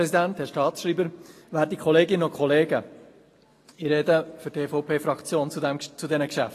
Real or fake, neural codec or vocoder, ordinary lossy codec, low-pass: real; none; AAC, 48 kbps; 14.4 kHz